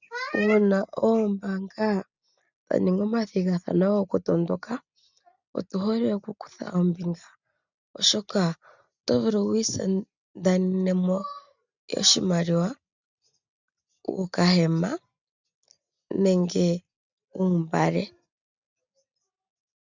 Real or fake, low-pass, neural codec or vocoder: real; 7.2 kHz; none